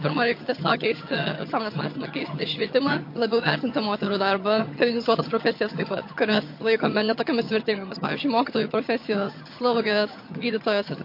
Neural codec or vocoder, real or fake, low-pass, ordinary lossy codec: vocoder, 22.05 kHz, 80 mel bands, HiFi-GAN; fake; 5.4 kHz; MP3, 32 kbps